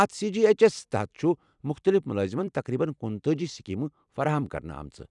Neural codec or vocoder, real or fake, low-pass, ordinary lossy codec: vocoder, 44.1 kHz, 128 mel bands every 256 samples, BigVGAN v2; fake; 10.8 kHz; none